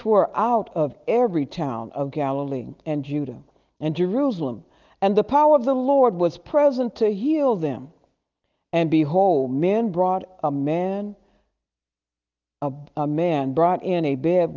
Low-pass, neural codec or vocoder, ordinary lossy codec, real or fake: 7.2 kHz; none; Opus, 24 kbps; real